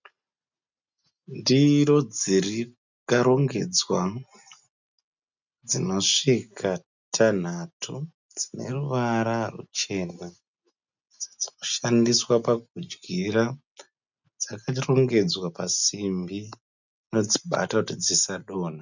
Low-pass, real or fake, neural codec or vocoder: 7.2 kHz; real; none